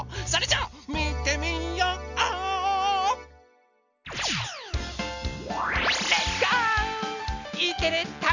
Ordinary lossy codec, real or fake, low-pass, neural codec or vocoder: none; real; 7.2 kHz; none